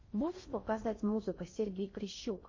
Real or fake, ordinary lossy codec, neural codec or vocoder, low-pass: fake; MP3, 32 kbps; codec, 16 kHz in and 24 kHz out, 0.6 kbps, FocalCodec, streaming, 4096 codes; 7.2 kHz